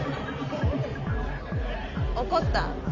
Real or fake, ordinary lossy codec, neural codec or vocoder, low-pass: real; none; none; 7.2 kHz